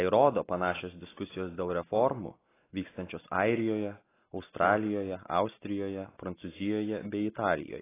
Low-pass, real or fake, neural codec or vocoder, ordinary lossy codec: 3.6 kHz; real; none; AAC, 16 kbps